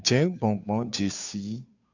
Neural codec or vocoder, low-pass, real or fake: codec, 16 kHz, 2 kbps, FunCodec, trained on Chinese and English, 25 frames a second; 7.2 kHz; fake